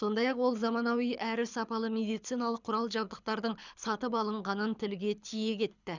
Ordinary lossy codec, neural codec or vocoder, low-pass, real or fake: none; codec, 24 kHz, 6 kbps, HILCodec; 7.2 kHz; fake